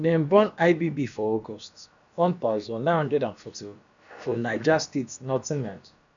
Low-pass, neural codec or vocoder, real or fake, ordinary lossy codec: 7.2 kHz; codec, 16 kHz, about 1 kbps, DyCAST, with the encoder's durations; fake; none